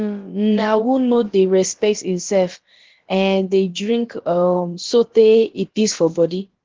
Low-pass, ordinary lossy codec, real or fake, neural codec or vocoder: 7.2 kHz; Opus, 16 kbps; fake; codec, 16 kHz, about 1 kbps, DyCAST, with the encoder's durations